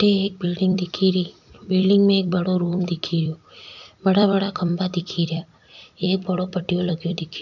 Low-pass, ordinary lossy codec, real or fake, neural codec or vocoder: 7.2 kHz; none; fake; vocoder, 44.1 kHz, 128 mel bands every 256 samples, BigVGAN v2